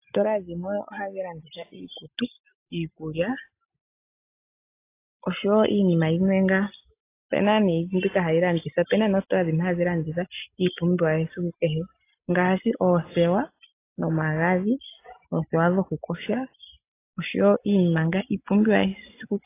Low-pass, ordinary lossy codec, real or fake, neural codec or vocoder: 3.6 kHz; AAC, 24 kbps; real; none